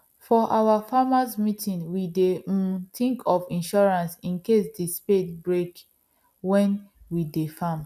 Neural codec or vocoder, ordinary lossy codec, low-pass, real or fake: none; none; 14.4 kHz; real